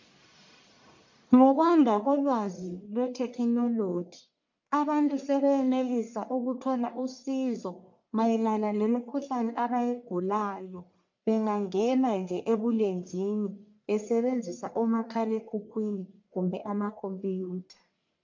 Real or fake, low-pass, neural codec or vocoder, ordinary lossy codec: fake; 7.2 kHz; codec, 44.1 kHz, 1.7 kbps, Pupu-Codec; MP3, 48 kbps